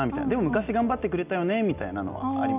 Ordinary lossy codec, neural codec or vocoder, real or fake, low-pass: none; none; real; 3.6 kHz